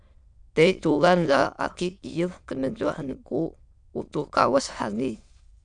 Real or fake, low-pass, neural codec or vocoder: fake; 9.9 kHz; autoencoder, 22.05 kHz, a latent of 192 numbers a frame, VITS, trained on many speakers